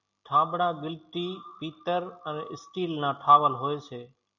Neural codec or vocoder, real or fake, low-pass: none; real; 7.2 kHz